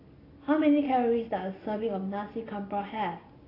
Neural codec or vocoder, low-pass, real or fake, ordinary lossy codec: none; 5.4 kHz; real; AAC, 24 kbps